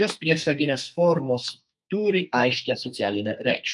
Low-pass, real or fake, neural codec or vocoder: 10.8 kHz; fake; codec, 32 kHz, 1.9 kbps, SNAC